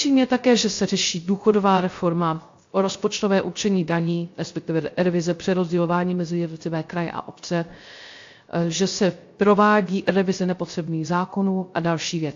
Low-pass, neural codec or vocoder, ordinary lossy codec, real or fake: 7.2 kHz; codec, 16 kHz, 0.3 kbps, FocalCodec; AAC, 48 kbps; fake